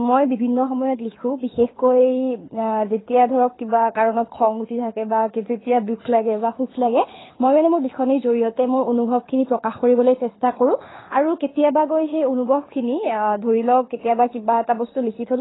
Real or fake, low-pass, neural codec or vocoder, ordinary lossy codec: fake; 7.2 kHz; codec, 24 kHz, 6 kbps, HILCodec; AAC, 16 kbps